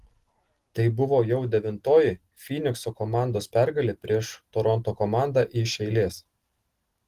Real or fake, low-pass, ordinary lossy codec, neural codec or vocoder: real; 14.4 kHz; Opus, 16 kbps; none